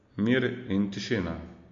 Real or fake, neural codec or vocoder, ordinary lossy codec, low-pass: real; none; MP3, 48 kbps; 7.2 kHz